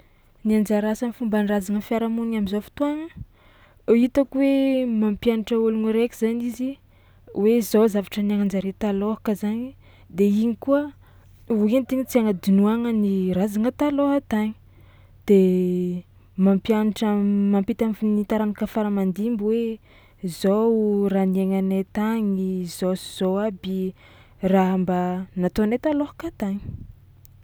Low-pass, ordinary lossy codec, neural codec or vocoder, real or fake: none; none; none; real